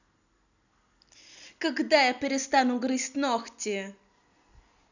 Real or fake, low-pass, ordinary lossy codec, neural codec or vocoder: real; 7.2 kHz; none; none